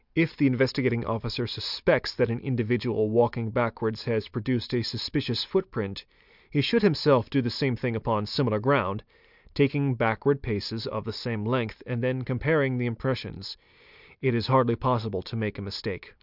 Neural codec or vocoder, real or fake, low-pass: none; real; 5.4 kHz